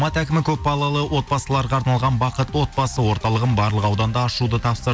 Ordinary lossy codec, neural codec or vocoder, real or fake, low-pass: none; none; real; none